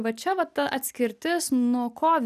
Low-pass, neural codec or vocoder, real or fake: 14.4 kHz; none; real